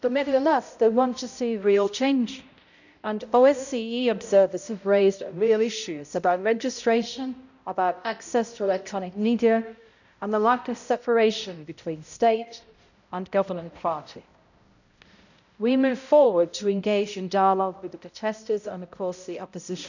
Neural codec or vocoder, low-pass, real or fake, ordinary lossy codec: codec, 16 kHz, 0.5 kbps, X-Codec, HuBERT features, trained on balanced general audio; 7.2 kHz; fake; none